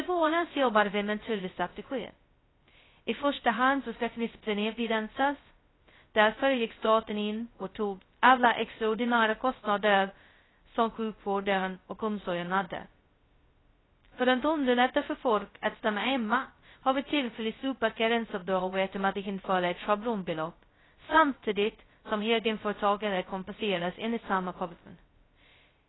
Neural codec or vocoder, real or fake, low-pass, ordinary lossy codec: codec, 16 kHz, 0.2 kbps, FocalCodec; fake; 7.2 kHz; AAC, 16 kbps